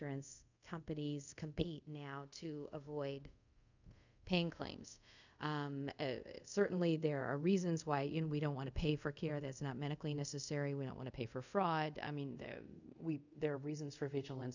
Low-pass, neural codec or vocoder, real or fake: 7.2 kHz; codec, 24 kHz, 0.5 kbps, DualCodec; fake